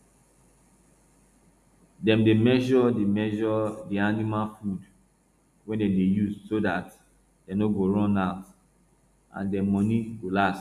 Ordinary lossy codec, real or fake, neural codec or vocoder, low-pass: none; real; none; 14.4 kHz